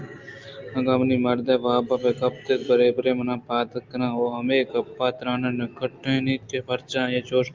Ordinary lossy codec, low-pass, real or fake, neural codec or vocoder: Opus, 24 kbps; 7.2 kHz; real; none